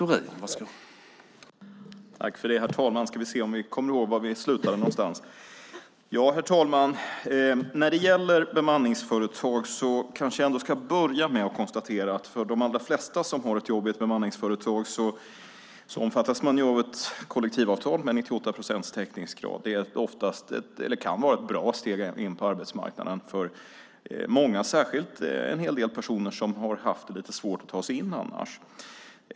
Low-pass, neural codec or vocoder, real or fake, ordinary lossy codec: none; none; real; none